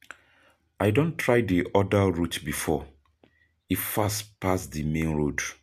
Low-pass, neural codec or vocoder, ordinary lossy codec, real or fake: 14.4 kHz; none; MP3, 96 kbps; real